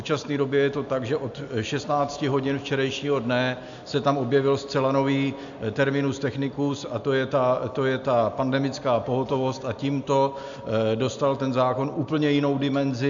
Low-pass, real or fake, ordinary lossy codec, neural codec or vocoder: 7.2 kHz; real; MP3, 64 kbps; none